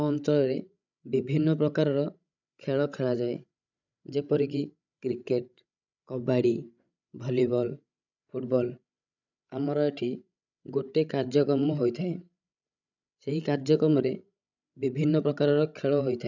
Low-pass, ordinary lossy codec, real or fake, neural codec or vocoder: 7.2 kHz; none; fake; codec, 16 kHz, 16 kbps, FreqCodec, larger model